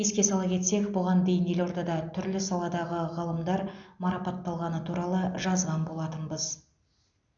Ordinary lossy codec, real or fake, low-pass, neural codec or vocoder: none; real; 7.2 kHz; none